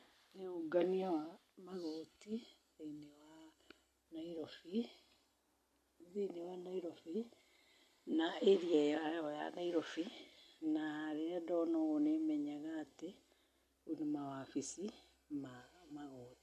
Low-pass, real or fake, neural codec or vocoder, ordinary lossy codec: 14.4 kHz; real; none; MP3, 64 kbps